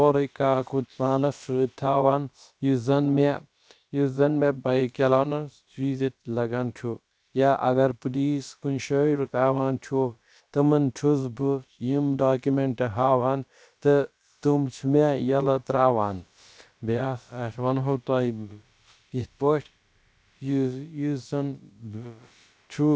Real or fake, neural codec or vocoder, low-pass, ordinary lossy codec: fake; codec, 16 kHz, about 1 kbps, DyCAST, with the encoder's durations; none; none